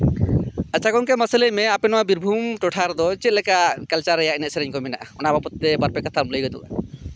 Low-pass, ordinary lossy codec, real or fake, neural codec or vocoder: none; none; real; none